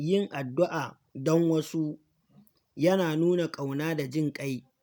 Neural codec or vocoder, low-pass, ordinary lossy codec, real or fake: none; none; none; real